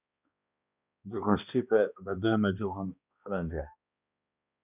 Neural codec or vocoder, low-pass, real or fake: codec, 16 kHz, 1 kbps, X-Codec, HuBERT features, trained on balanced general audio; 3.6 kHz; fake